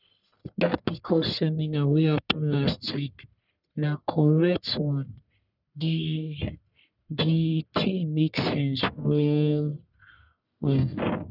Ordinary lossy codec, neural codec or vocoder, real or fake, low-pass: none; codec, 44.1 kHz, 1.7 kbps, Pupu-Codec; fake; 5.4 kHz